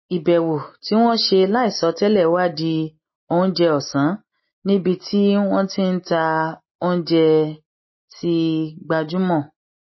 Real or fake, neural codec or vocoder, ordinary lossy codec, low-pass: real; none; MP3, 24 kbps; 7.2 kHz